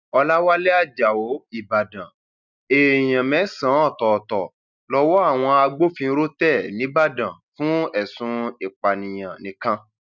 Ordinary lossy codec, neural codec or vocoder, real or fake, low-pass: none; none; real; 7.2 kHz